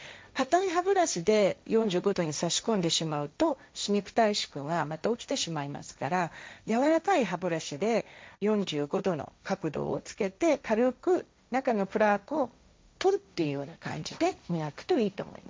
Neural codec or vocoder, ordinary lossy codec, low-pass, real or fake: codec, 16 kHz, 1.1 kbps, Voila-Tokenizer; none; none; fake